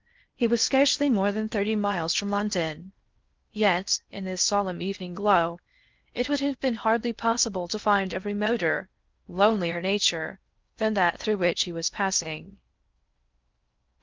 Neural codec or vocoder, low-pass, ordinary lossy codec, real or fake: codec, 16 kHz in and 24 kHz out, 0.6 kbps, FocalCodec, streaming, 4096 codes; 7.2 kHz; Opus, 32 kbps; fake